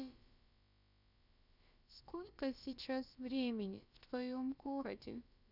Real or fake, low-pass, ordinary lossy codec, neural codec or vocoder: fake; 5.4 kHz; none; codec, 16 kHz, about 1 kbps, DyCAST, with the encoder's durations